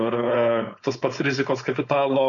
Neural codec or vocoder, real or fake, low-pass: codec, 16 kHz, 4.8 kbps, FACodec; fake; 7.2 kHz